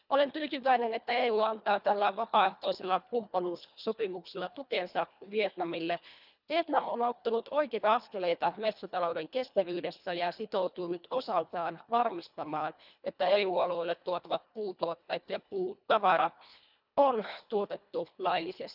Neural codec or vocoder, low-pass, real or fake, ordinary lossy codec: codec, 24 kHz, 1.5 kbps, HILCodec; 5.4 kHz; fake; none